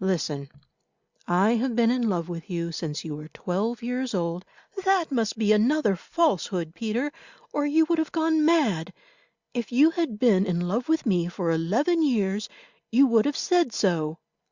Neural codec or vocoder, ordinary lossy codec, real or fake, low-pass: none; Opus, 64 kbps; real; 7.2 kHz